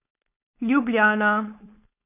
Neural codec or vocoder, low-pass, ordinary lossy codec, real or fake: codec, 16 kHz, 4.8 kbps, FACodec; 3.6 kHz; none; fake